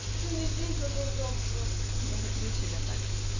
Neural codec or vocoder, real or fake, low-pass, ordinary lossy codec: none; real; 7.2 kHz; none